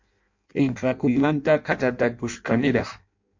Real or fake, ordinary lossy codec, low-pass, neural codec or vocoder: fake; MP3, 48 kbps; 7.2 kHz; codec, 16 kHz in and 24 kHz out, 0.6 kbps, FireRedTTS-2 codec